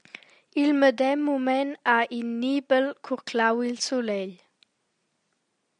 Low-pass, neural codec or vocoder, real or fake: 9.9 kHz; none; real